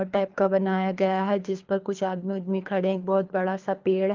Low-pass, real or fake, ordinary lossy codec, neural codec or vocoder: 7.2 kHz; fake; Opus, 32 kbps; codec, 24 kHz, 3 kbps, HILCodec